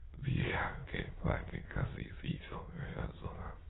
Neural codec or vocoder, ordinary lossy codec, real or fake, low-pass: autoencoder, 22.05 kHz, a latent of 192 numbers a frame, VITS, trained on many speakers; AAC, 16 kbps; fake; 7.2 kHz